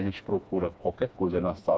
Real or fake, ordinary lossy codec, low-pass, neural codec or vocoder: fake; none; none; codec, 16 kHz, 2 kbps, FreqCodec, smaller model